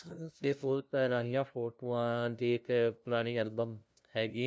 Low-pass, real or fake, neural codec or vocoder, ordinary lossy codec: none; fake; codec, 16 kHz, 0.5 kbps, FunCodec, trained on LibriTTS, 25 frames a second; none